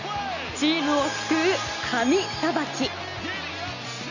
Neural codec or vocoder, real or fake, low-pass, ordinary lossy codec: none; real; 7.2 kHz; none